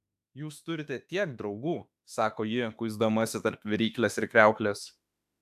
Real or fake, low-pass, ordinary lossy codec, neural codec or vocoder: fake; 14.4 kHz; AAC, 96 kbps; autoencoder, 48 kHz, 32 numbers a frame, DAC-VAE, trained on Japanese speech